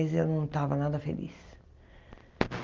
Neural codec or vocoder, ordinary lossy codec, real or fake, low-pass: none; Opus, 32 kbps; real; 7.2 kHz